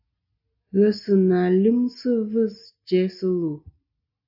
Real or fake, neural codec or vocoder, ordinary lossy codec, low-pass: real; none; AAC, 48 kbps; 5.4 kHz